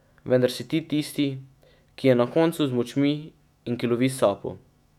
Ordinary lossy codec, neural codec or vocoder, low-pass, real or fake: none; autoencoder, 48 kHz, 128 numbers a frame, DAC-VAE, trained on Japanese speech; 19.8 kHz; fake